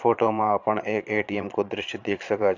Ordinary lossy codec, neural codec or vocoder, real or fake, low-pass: AAC, 48 kbps; vocoder, 22.05 kHz, 80 mel bands, Vocos; fake; 7.2 kHz